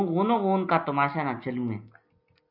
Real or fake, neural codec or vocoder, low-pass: real; none; 5.4 kHz